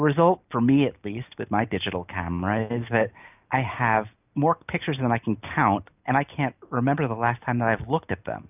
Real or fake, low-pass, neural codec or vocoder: real; 3.6 kHz; none